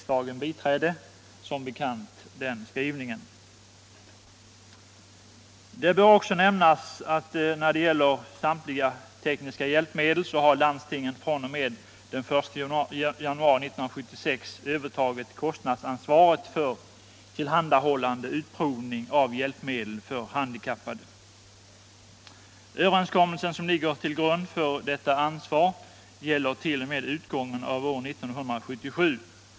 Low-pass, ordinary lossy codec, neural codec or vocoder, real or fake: none; none; none; real